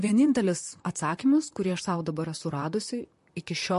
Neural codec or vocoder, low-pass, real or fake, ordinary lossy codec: vocoder, 44.1 kHz, 128 mel bands, Pupu-Vocoder; 14.4 kHz; fake; MP3, 48 kbps